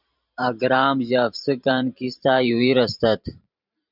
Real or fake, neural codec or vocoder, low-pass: fake; vocoder, 44.1 kHz, 128 mel bands every 512 samples, BigVGAN v2; 5.4 kHz